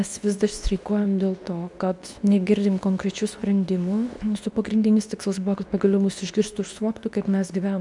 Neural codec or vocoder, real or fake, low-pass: codec, 24 kHz, 0.9 kbps, WavTokenizer, medium speech release version 2; fake; 10.8 kHz